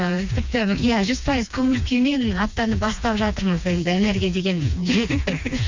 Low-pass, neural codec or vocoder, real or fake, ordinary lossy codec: 7.2 kHz; codec, 16 kHz, 2 kbps, FreqCodec, smaller model; fake; MP3, 64 kbps